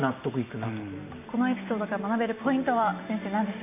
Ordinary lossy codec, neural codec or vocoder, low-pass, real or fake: none; none; 3.6 kHz; real